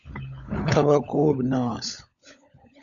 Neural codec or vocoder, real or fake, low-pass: codec, 16 kHz, 16 kbps, FunCodec, trained on LibriTTS, 50 frames a second; fake; 7.2 kHz